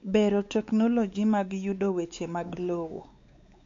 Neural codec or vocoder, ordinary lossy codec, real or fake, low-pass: codec, 16 kHz, 4 kbps, X-Codec, WavLM features, trained on Multilingual LibriSpeech; AAC, 48 kbps; fake; 7.2 kHz